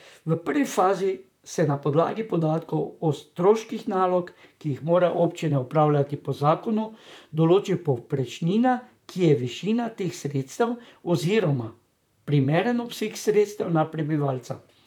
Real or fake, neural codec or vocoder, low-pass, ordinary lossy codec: fake; vocoder, 44.1 kHz, 128 mel bands, Pupu-Vocoder; 19.8 kHz; none